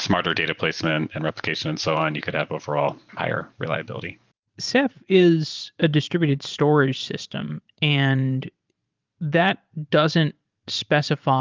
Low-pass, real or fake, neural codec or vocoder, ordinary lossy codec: 7.2 kHz; fake; vocoder, 44.1 kHz, 128 mel bands every 512 samples, BigVGAN v2; Opus, 32 kbps